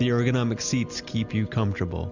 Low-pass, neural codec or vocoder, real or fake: 7.2 kHz; none; real